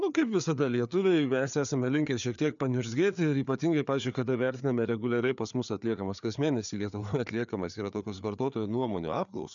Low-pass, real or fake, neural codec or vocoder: 7.2 kHz; fake; codec, 16 kHz, 4 kbps, FreqCodec, larger model